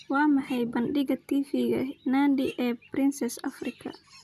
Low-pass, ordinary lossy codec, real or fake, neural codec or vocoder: 14.4 kHz; none; real; none